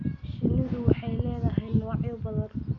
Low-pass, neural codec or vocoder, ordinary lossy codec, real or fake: 7.2 kHz; none; none; real